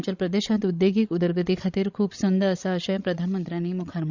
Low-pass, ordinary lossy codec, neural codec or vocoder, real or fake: 7.2 kHz; Opus, 64 kbps; codec, 16 kHz, 16 kbps, FreqCodec, larger model; fake